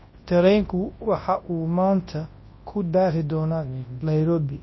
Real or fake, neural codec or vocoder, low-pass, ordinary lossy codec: fake; codec, 24 kHz, 0.9 kbps, WavTokenizer, large speech release; 7.2 kHz; MP3, 24 kbps